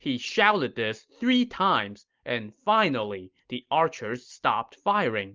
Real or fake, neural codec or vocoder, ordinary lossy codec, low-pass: real; none; Opus, 24 kbps; 7.2 kHz